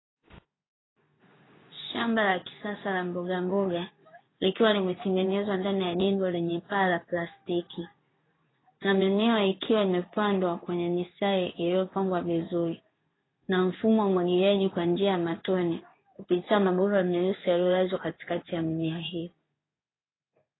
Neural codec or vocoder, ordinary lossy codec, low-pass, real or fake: codec, 16 kHz in and 24 kHz out, 1 kbps, XY-Tokenizer; AAC, 16 kbps; 7.2 kHz; fake